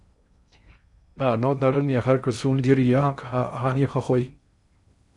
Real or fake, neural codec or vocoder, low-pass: fake; codec, 16 kHz in and 24 kHz out, 0.6 kbps, FocalCodec, streaming, 2048 codes; 10.8 kHz